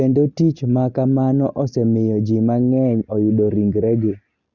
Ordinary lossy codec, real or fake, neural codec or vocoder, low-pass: Opus, 64 kbps; real; none; 7.2 kHz